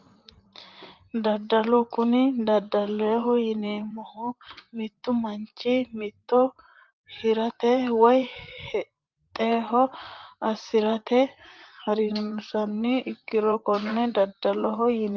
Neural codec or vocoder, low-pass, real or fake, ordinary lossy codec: vocoder, 44.1 kHz, 128 mel bands, Pupu-Vocoder; 7.2 kHz; fake; Opus, 24 kbps